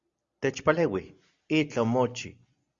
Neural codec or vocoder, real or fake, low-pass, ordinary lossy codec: none; real; 7.2 kHz; Opus, 64 kbps